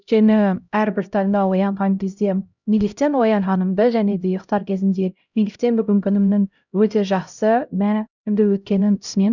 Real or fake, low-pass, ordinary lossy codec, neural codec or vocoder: fake; 7.2 kHz; none; codec, 16 kHz, 0.5 kbps, X-Codec, HuBERT features, trained on LibriSpeech